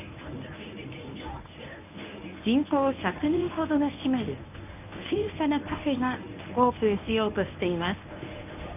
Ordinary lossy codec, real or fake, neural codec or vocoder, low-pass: none; fake; codec, 24 kHz, 0.9 kbps, WavTokenizer, medium speech release version 1; 3.6 kHz